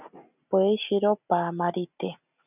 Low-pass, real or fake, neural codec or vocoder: 3.6 kHz; real; none